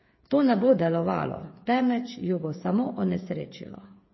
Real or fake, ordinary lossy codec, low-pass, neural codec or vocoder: fake; MP3, 24 kbps; 7.2 kHz; codec, 16 kHz, 8 kbps, FreqCodec, smaller model